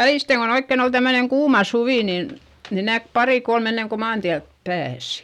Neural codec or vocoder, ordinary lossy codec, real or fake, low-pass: none; none; real; 19.8 kHz